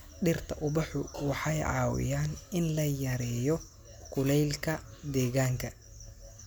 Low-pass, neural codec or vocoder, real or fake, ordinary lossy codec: none; none; real; none